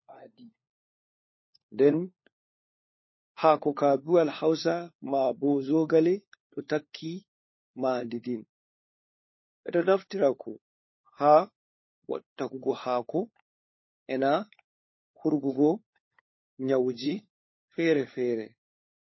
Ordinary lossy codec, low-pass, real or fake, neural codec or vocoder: MP3, 24 kbps; 7.2 kHz; fake; codec, 16 kHz, 4 kbps, FunCodec, trained on LibriTTS, 50 frames a second